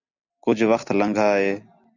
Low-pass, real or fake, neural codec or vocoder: 7.2 kHz; real; none